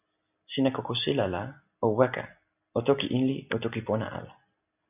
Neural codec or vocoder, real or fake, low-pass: none; real; 3.6 kHz